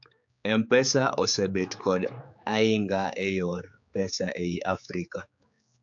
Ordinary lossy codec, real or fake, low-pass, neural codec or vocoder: AAC, 64 kbps; fake; 7.2 kHz; codec, 16 kHz, 4 kbps, X-Codec, HuBERT features, trained on general audio